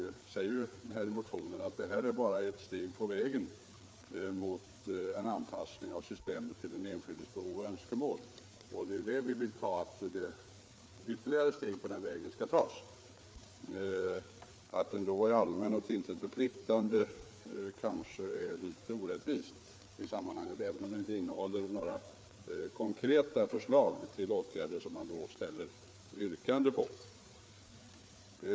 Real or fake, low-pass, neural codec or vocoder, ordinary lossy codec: fake; none; codec, 16 kHz, 4 kbps, FreqCodec, larger model; none